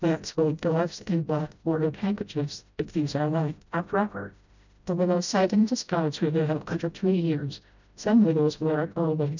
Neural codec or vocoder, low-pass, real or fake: codec, 16 kHz, 0.5 kbps, FreqCodec, smaller model; 7.2 kHz; fake